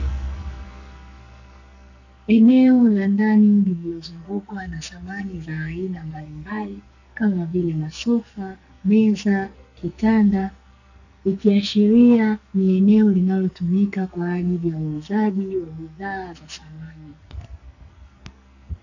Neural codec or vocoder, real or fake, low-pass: codec, 44.1 kHz, 2.6 kbps, SNAC; fake; 7.2 kHz